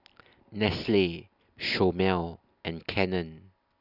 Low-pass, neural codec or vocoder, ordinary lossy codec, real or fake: 5.4 kHz; none; none; real